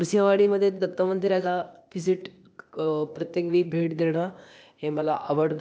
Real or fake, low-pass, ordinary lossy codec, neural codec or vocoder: fake; none; none; codec, 16 kHz, 0.8 kbps, ZipCodec